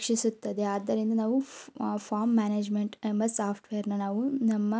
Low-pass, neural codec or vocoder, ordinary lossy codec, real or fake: none; none; none; real